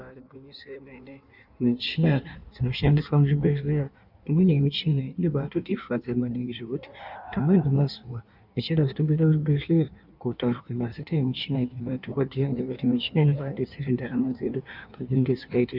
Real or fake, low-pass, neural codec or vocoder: fake; 5.4 kHz; codec, 16 kHz in and 24 kHz out, 1.1 kbps, FireRedTTS-2 codec